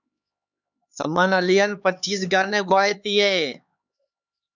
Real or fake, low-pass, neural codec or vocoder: fake; 7.2 kHz; codec, 16 kHz, 4 kbps, X-Codec, HuBERT features, trained on LibriSpeech